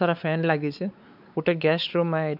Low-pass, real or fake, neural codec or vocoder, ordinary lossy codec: 5.4 kHz; fake; codec, 16 kHz, 4 kbps, X-Codec, WavLM features, trained on Multilingual LibriSpeech; none